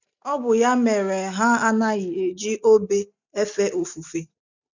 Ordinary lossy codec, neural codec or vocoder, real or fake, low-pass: none; none; real; 7.2 kHz